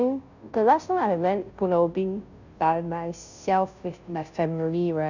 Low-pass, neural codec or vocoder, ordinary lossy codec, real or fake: 7.2 kHz; codec, 16 kHz, 0.5 kbps, FunCodec, trained on Chinese and English, 25 frames a second; none; fake